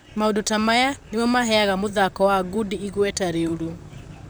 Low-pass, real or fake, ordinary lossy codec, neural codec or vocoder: none; fake; none; vocoder, 44.1 kHz, 128 mel bands, Pupu-Vocoder